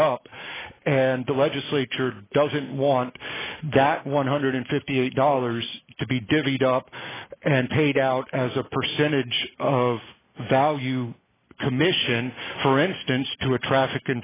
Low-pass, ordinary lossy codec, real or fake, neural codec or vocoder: 3.6 kHz; AAC, 16 kbps; real; none